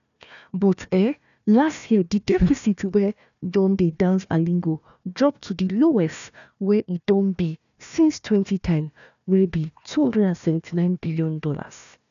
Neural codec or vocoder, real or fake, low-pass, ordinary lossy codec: codec, 16 kHz, 1 kbps, FunCodec, trained on Chinese and English, 50 frames a second; fake; 7.2 kHz; none